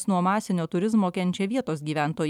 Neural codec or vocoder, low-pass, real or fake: vocoder, 44.1 kHz, 128 mel bands every 256 samples, BigVGAN v2; 19.8 kHz; fake